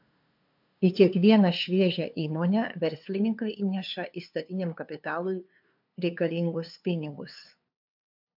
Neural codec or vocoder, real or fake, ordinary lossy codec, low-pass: codec, 16 kHz, 2 kbps, FunCodec, trained on LibriTTS, 25 frames a second; fake; MP3, 48 kbps; 5.4 kHz